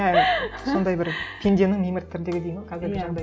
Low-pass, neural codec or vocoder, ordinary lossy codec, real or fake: none; none; none; real